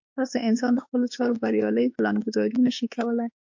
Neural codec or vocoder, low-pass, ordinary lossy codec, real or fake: autoencoder, 48 kHz, 32 numbers a frame, DAC-VAE, trained on Japanese speech; 7.2 kHz; MP3, 48 kbps; fake